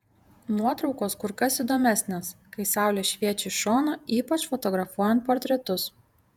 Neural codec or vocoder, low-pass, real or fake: vocoder, 48 kHz, 128 mel bands, Vocos; 19.8 kHz; fake